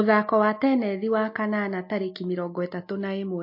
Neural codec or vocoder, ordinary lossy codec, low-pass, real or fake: none; MP3, 32 kbps; 5.4 kHz; real